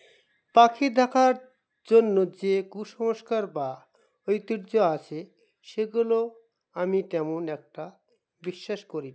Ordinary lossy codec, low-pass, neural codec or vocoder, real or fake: none; none; none; real